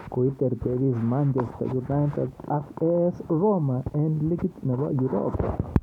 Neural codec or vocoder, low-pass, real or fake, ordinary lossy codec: none; 19.8 kHz; real; none